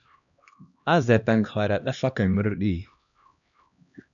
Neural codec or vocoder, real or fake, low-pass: codec, 16 kHz, 1 kbps, X-Codec, HuBERT features, trained on LibriSpeech; fake; 7.2 kHz